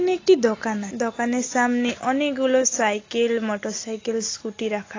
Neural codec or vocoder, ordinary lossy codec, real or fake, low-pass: none; AAC, 32 kbps; real; 7.2 kHz